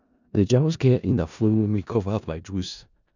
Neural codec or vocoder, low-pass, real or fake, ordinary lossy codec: codec, 16 kHz in and 24 kHz out, 0.4 kbps, LongCat-Audio-Codec, four codebook decoder; 7.2 kHz; fake; none